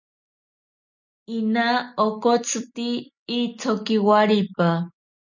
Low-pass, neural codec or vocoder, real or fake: 7.2 kHz; none; real